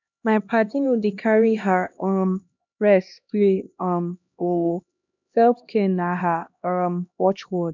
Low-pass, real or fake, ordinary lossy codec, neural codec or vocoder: 7.2 kHz; fake; none; codec, 16 kHz, 1 kbps, X-Codec, HuBERT features, trained on LibriSpeech